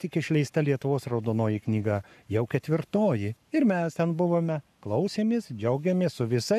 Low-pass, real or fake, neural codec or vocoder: 14.4 kHz; fake; codec, 44.1 kHz, 7.8 kbps, Pupu-Codec